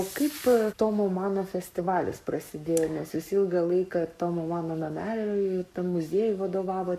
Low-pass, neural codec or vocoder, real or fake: 14.4 kHz; codec, 44.1 kHz, 7.8 kbps, Pupu-Codec; fake